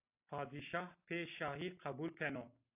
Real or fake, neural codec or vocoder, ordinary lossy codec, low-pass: real; none; AAC, 32 kbps; 3.6 kHz